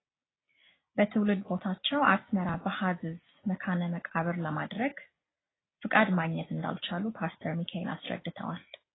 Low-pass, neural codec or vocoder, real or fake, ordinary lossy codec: 7.2 kHz; none; real; AAC, 16 kbps